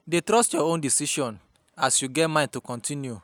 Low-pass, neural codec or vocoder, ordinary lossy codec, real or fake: none; none; none; real